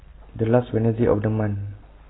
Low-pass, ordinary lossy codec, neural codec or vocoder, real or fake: 7.2 kHz; AAC, 16 kbps; none; real